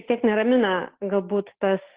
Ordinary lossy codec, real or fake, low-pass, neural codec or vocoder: Opus, 16 kbps; real; 3.6 kHz; none